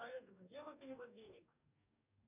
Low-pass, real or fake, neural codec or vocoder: 3.6 kHz; fake; codec, 44.1 kHz, 2.6 kbps, DAC